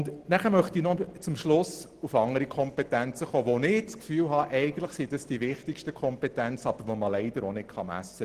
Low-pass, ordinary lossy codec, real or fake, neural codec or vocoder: 14.4 kHz; Opus, 16 kbps; real; none